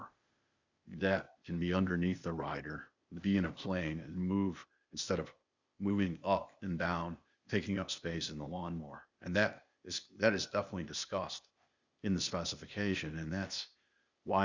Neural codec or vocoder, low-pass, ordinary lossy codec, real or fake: codec, 16 kHz, 0.8 kbps, ZipCodec; 7.2 kHz; Opus, 64 kbps; fake